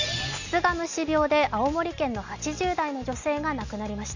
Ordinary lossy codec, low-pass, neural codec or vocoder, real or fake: none; 7.2 kHz; none; real